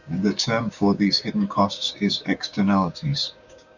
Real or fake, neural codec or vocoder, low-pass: fake; codec, 16 kHz, 6 kbps, DAC; 7.2 kHz